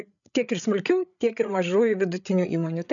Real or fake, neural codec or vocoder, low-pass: fake; codec, 16 kHz, 8 kbps, FreqCodec, larger model; 7.2 kHz